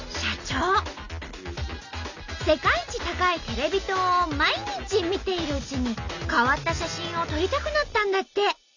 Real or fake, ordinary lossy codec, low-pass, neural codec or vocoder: real; none; 7.2 kHz; none